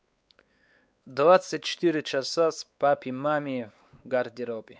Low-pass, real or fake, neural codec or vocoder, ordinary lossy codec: none; fake; codec, 16 kHz, 4 kbps, X-Codec, WavLM features, trained on Multilingual LibriSpeech; none